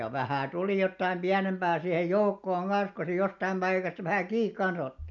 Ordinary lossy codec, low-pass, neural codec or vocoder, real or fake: none; 7.2 kHz; none; real